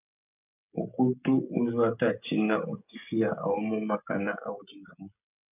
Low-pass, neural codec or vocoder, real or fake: 3.6 kHz; codec, 16 kHz, 16 kbps, FreqCodec, smaller model; fake